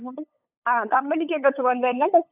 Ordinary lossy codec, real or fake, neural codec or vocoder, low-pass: AAC, 32 kbps; fake; codec, 16 kHz, 8 kbps, FunCodec, trained on LibriTTS, 25 frames a second; 3.6 kHz